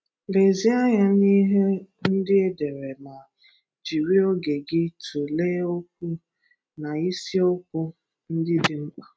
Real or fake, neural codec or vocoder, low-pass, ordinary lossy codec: real; none; none; none